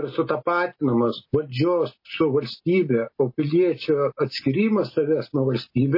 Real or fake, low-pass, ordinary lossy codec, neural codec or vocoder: real; 5.4 kHz; MP3, 24 kbps; none